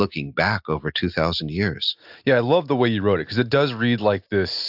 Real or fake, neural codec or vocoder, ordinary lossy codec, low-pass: real; none; AAC, 48 kbps; 5.4 kHz